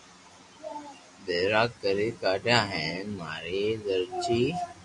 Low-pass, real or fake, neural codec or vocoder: 10.8 kHz; real; none